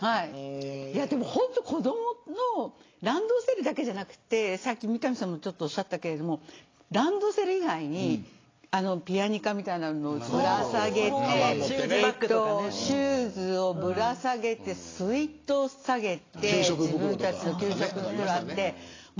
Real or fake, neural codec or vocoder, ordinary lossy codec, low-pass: real; none; AAC, 32 kbps; 7.2 kHz